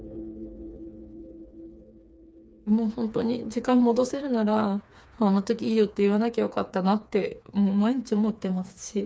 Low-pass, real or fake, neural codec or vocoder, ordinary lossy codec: none; fake; codec, 16 kHz, 4 kbps, FreqCodec, smaller model; none